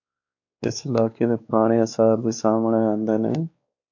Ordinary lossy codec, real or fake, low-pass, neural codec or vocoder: MP3, 48 kbps; fake; 7.2 kHz; codec, 16 kHz, 2 kbps, X-Codec, WavLM features, trained on Multilingual LibriSpeech